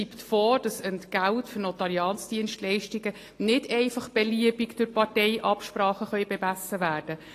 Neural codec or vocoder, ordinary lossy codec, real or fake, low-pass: none; AAC, 48 kbps; real; 14.4 kHz